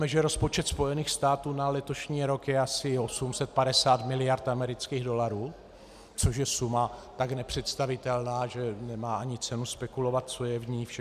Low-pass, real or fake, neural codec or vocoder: 14.4 kHz; real; none